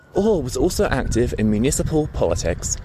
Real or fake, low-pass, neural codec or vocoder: real; 14.4 kHz; none